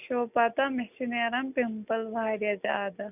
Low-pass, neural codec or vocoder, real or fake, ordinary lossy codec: 3.6 kHz; none; real; none